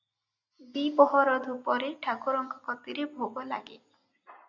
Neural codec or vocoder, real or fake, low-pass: none; real; 7.2 kHz